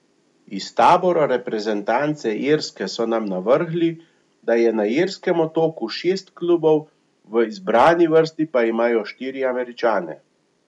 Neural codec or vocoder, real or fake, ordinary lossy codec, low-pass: none; real; none; 10.8 kHz